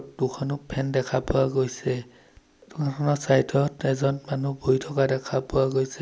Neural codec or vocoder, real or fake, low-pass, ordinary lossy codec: none; real; none; none